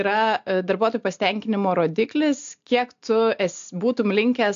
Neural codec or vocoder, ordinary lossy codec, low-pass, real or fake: none; AAC, 64 kbps; 7.2 kHz; real